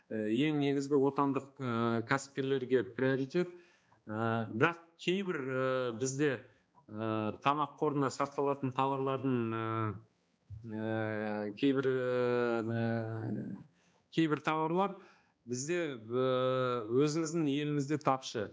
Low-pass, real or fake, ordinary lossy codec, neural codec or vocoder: none; fake; none; codec, 16 kHz, 2 kbps, X-Codec, HuBERT features, trained on balanced general audio